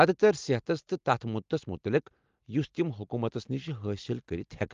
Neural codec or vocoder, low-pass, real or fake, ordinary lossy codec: none; 7.2 kHz; real; Opus, 32 kbps